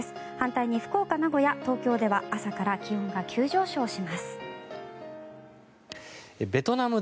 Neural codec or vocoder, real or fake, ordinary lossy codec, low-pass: none; real; none; none